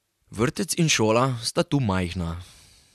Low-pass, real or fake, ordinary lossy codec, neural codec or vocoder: 14.4 kHz; real; none; none